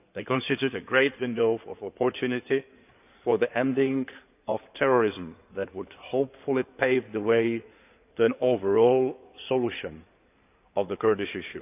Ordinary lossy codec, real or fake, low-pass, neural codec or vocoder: none; fake; 3.6 kHz; codec, 16 kHz in and 24 kHz out, 2.2 kbps, FireRedTTS-2 codec